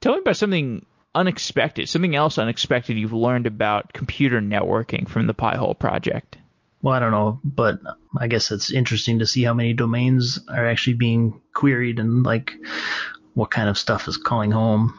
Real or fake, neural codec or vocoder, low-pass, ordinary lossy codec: real; none; 7.2 kHz; MP3, 48 kbps